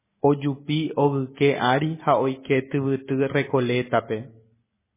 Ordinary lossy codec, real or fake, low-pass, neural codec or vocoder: MP3, 16 kbps; real; 3.6 kHz; none